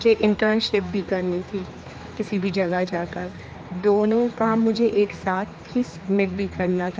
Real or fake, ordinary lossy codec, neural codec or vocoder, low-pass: fake; none; codec, 16 kHz, 2 kbps, X-Codec, HuBERT features, trained on general audio; none